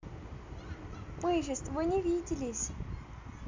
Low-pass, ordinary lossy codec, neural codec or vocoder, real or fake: 7.2 kHz; MP3, 64 kbps; none; real